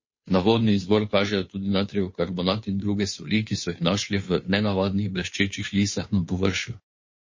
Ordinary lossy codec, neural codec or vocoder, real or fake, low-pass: MP3, 32 kbps; codec, 16 kHz, 2 kbps, FunCodec, trained on Chinese and English, 25 frames a second; fake; 7.2 kHz